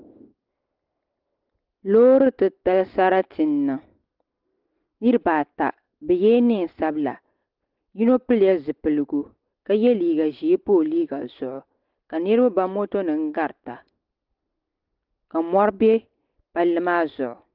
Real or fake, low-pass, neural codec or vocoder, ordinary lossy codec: real; 5.4 kHz; none; Opus, 16 kbps